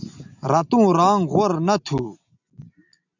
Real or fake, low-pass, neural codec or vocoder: real; 7.2 kHz; none